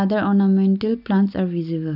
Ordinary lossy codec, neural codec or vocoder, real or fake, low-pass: none; none; real; 5.4 kHz